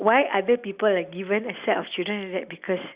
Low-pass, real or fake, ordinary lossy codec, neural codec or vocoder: 3.6 kHz; real; Opus, 64 kbps; none